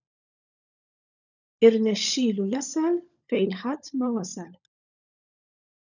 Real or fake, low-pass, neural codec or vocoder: fake; 7.2 kHz; codec, 16 kHz, 16 kbps, FunCodec, trained on LibriTTS, 50 frames a second